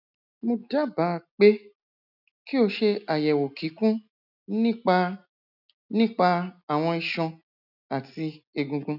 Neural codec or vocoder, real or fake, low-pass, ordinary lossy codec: none; real; 5.4 kHz; none